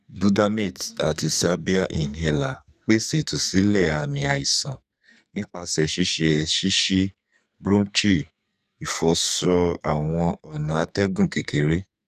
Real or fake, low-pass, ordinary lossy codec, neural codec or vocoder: fake; 14.4 kHz; none; codec, 44.1 kHz, 2.6 kbps, SNAC